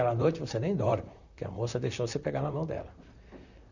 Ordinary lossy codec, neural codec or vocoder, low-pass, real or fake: none; vocoder, 44.1 kHz, 128 mel bands, Pupu-Vocoder; 7.2 kHz; fake